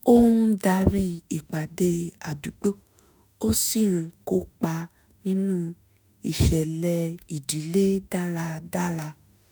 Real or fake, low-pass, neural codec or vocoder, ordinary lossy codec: fake; none; autoencoder, 48 kHz, 32 numbers a frame, DAC-VAE, trained on Japanese speech; none